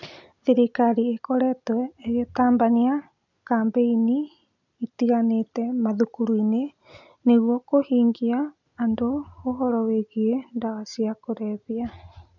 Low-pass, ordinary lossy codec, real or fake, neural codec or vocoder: 7.2 kHz; none; real; none